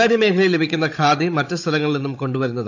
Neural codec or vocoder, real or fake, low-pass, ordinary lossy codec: codec, 16 kHz, 16 kbps, FunCodec, trained on Chinese and English, 50 frames a second; fake; 7.2 kHz; AAC, 48 kbps